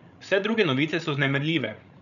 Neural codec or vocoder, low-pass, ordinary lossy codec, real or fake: codec, 16 kHz, 16 kbps, FreqCodec, larger model; 7.2 kHz; none; fake